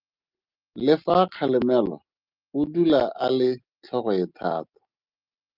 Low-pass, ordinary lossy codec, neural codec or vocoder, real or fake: 5.4 kHz; Opus, 24 kbps; none; real